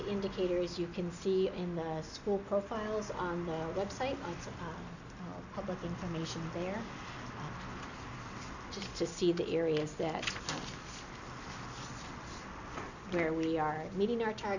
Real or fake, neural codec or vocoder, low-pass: real; none; 7.2 kHz